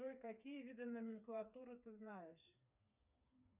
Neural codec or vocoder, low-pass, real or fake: codec, 16 kHz, 4 kbps, FreqCodec, smaller model; 3.6 kHz; fake